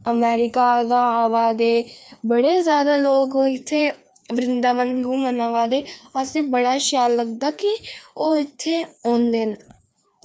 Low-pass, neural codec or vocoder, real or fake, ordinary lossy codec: none; codec, 16 kHz, 2 kbps, FreqCodec, larger model; fake; none